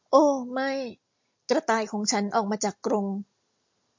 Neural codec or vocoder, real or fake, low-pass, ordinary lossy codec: none; real; 7.2 kHz; MP3, 48 kbps